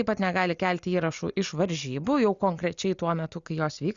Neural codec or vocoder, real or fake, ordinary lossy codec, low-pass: none; real; Opus, 64 kbps; 7.2 kHz